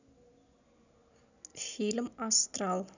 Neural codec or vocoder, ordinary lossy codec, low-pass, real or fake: none; none; 7.2 kHz; real